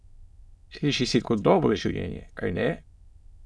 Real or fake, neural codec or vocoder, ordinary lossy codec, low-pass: fake; autoencoder, 22.05 kHz, a latent of 192 numbers a frame, VITS, trained on many speakers; none; none